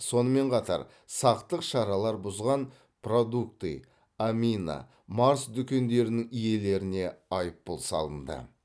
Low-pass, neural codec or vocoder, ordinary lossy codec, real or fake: none; none; none; real